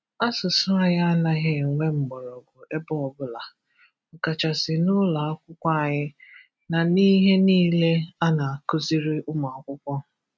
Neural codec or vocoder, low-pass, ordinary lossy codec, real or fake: none; none; none; real